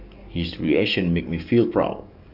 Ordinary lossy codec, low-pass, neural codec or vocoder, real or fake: none; 5.4 kHz; codec, 44.1 kHz, 7.8 kbps, DAC; fake